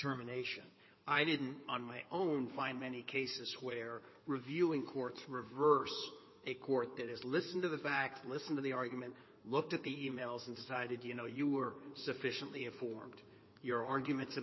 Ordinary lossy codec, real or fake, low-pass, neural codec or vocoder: MP3, 24 kbps; fake; 7.2 kHz; codec, 16 kHz in and 24 kHz out, 2.2 kbps, FireRedTTS-2 codec